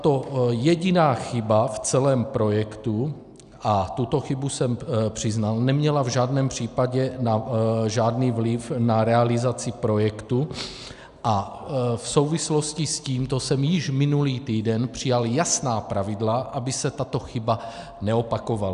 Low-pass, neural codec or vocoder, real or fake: 10.8 kHz; none; real